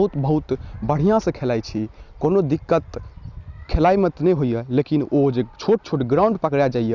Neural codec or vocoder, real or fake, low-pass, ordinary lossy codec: none; real; 7.2 kHz; Opus, 64 kbps